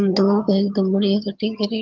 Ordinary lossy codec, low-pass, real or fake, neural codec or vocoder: Opus, 24 kbps; 7.2 kHz; fake; vocoder, 22.05 kHz, 80 mel bands, HiFi-GAN